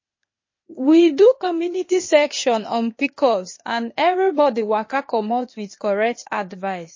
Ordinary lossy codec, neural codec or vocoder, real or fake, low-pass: MP3, 32 kbps; codec, 16 kHz, 0.8 kbps, ZipCodec; fake; 7.2 kHz